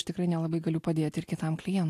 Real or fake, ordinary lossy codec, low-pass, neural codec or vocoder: real; AAC, 64 kbps; 14.4 kHz; none